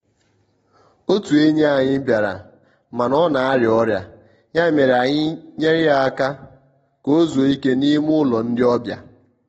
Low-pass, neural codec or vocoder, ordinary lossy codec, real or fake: 9.9 kHz; none; AAC, 24 kbps; real